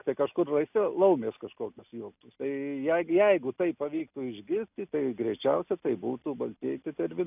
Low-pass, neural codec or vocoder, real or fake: 3.6 kHz; none; real